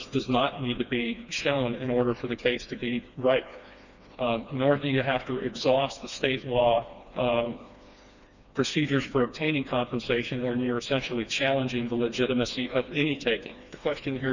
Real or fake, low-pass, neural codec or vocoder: fake; 7.2 kHz; codec, 16 kHz, 2 kbps, FreqCodec, smaller model